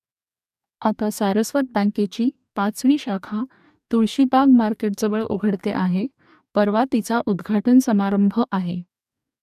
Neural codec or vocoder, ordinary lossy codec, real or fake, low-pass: codec, 44.1 kHz, 2.6 kbps, DAC; none; fake; 14.4 kHz